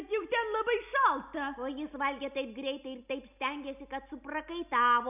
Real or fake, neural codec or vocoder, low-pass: real; none; 3.6 kHz